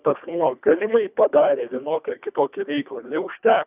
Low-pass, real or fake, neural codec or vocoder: 3.6 kHz; fake; codec, 24 kHz, 1.5 kbps, HILCodec